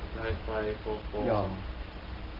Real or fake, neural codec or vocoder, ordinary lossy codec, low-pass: real; none; Opus, 16 kbps; 5.4 kHz